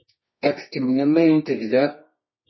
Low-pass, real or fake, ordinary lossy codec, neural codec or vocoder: 7.2 kHz; fake; MP3, 24 kbps; codec, 24 kHz, 0.9 kbps, WavTokenizer, medium music audio release